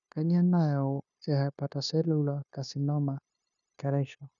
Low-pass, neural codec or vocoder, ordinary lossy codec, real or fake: 7.2 kHz; codec, 16 kHz, 0.9 kbps, LongCat-Audio-Codec; none; fake